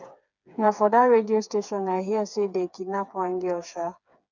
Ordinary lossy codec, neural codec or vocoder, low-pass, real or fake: none; codec, 16 kHz, 4 kbps, FreqCodec, smaller model; 7.2 kHz; fake